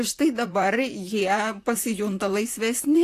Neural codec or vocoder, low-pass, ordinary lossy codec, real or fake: vocoder, 44.1 kHz, 128 mel bands, Pupu-Vocoder; 14.4 kHz; AAC, 64 kbps; fake